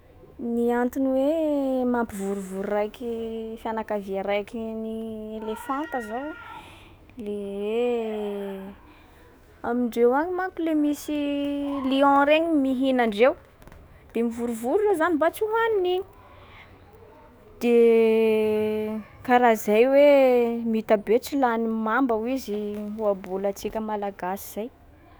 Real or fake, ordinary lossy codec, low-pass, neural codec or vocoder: fake; none; none; autoencoder, 48 kHz, 128 numbers a frame, DAC-VAE, trained on Japanese speech